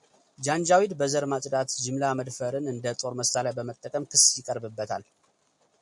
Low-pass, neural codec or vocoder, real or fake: 10.8 kHz; none; real